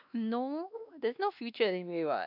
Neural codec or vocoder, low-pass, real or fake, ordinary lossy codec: codec, 16 kHz, 2 kbps, X-Codec, WavLM features, trained on Multilingual LibriSpeech; 5.4 kHz; fake; none